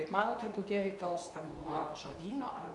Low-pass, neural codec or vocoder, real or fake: 10.8 kHz; codec, 24 kHz, 0.9 kbps, WavTokenizer, medium speech release version 2; fake